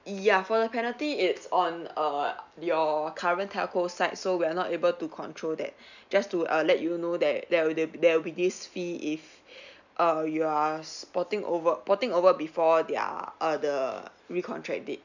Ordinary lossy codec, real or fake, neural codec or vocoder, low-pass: none; real; none; 7.2 kHz